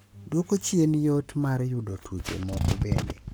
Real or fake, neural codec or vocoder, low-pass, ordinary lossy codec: fake; codec, 44.1 kHz, 7.8 kbps, Pupu-Codec; none; none